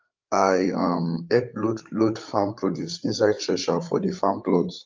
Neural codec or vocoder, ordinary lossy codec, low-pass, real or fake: codec, 16 kHz, 8 kbps, FreqCodec, larger model; Opus, 24 kbps; 7.2 kHz; fake